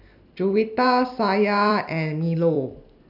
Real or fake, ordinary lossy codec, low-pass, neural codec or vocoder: fake; none; 5.4 kHz; vocoder, 44.1 kHz, 128 mel bands every 256 samples, BigVGAN v2